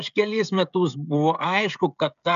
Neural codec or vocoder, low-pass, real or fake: codec, 16 kHz, 16 kbps, FreqCodec, smaller model; 7.2 kHz; fake